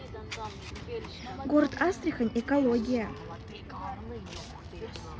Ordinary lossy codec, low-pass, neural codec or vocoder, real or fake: none; none; none; real